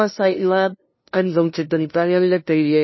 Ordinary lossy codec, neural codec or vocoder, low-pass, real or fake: MP3, 24 kbps; codec, 16 kHz, 0.5 kbps, FunCodec, trained on LibriTTS, 25 frames a second; 7.2 kHz; fake